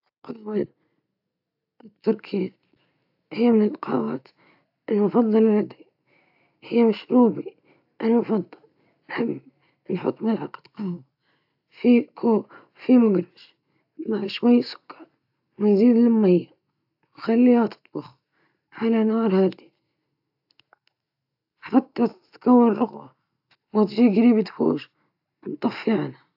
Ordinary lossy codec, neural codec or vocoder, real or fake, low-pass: AAC, 48 kbps; none; real; 5.4 kHz